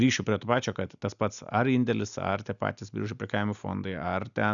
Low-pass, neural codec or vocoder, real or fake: 7.2 kHz; none; real